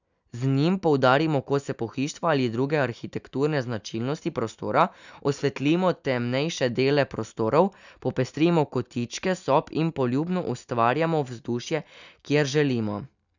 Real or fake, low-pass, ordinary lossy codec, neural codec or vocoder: real; 7.2 kHz; none; none